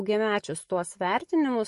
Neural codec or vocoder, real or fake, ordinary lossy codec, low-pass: none; real; MP3, 48 kbps; 14.4 kHz